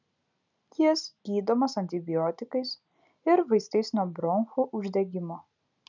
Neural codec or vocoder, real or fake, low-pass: none; real; 7.2 kHz